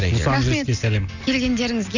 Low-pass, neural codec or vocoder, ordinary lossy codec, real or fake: 7.2 kHz; none; none; real